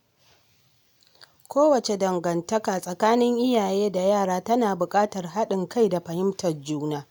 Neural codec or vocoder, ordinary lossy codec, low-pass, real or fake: none; none; none; real